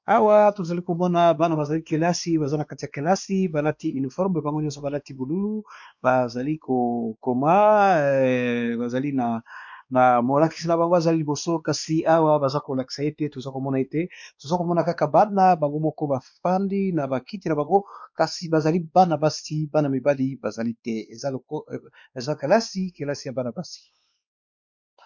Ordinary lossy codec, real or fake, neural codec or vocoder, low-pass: MP3, 64 kbps; fake; codec, 16 kHz, 2 kbps, X-Codec, WavLM features, trained on Multilingual LibriSpeech; 7.2 kHz